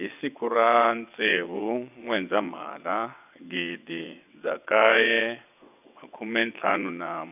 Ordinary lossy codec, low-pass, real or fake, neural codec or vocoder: none; 3.6 kHz; fake; vocoder, 22.05 kHz, 80 mel bands, WaveNeXt